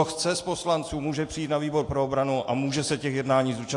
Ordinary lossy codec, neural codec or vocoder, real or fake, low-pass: AAC, 48 kbps; none; real; 10.8 kHz